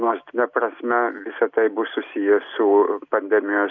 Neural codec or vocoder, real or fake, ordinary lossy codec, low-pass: none; real; MP3, 64 kbps; 7.2 kHz